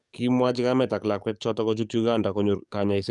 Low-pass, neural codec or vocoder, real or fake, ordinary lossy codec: 10.8 kHz; codec, 44.1 kHz, 7.8 kbps, DAC; fake; none